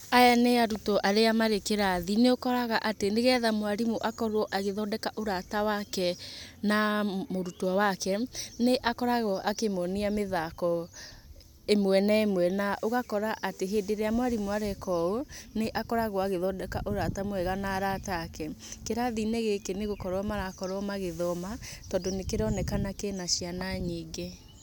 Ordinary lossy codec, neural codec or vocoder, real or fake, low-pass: none; none; real; none